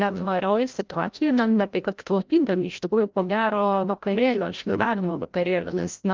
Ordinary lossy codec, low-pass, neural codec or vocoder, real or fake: Opus, 24 kbps; 7.2 kHz; codec, 16 kHz, 0.5 kbps, FreqCodec, larger model; fake